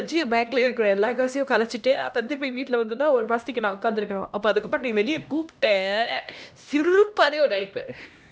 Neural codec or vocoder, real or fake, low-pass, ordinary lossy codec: codec, 16 kHz, 1 kbps, X-Codec, HuBERT features, trained on LibriSpeech; fake; none; none